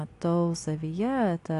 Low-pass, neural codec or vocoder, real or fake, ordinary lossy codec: 10.8 kHz; none; real; AAC, 48 kbps